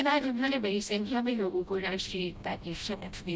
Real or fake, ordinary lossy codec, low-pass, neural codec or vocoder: fake; none; none; codec, 16 kHz, 0.5 kbps, FreqCodec, smaller model